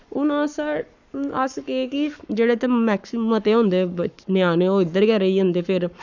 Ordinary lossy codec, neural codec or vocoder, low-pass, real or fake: none; codec, 44.1 kHz, 7.8 kbps, Pupu-Codec; 7.2 kHz; fake